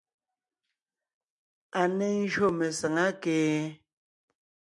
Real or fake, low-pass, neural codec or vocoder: real; 10.8 kHz; none